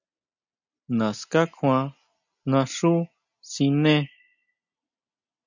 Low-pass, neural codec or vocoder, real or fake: 7.2 kHz; none; real